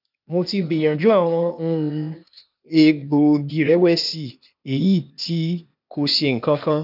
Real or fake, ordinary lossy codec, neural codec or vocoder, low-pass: fake; none; codec, 16 kHz, 0.8 kbps, ZipCodec; 5.4 kHz